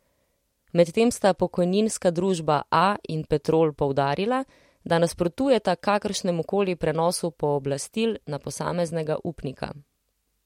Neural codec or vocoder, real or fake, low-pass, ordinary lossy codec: none; real; 19.8 kHz; MP3, 64 kbps